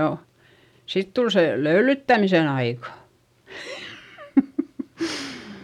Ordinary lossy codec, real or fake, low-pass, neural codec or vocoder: none; real; 19.8 kHz; none